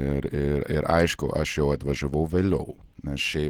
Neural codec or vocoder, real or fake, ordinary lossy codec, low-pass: none; real; Opus, 16 kbps; 19.8 kHz